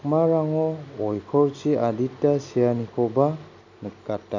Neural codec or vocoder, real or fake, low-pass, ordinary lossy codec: none; real; 7.2 kHz; none